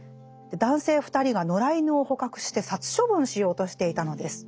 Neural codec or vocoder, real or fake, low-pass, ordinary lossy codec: none; real; none; none